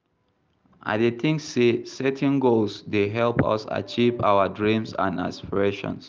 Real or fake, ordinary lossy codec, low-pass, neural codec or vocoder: real; Opus, 24 kbps; 7.2 kHz; none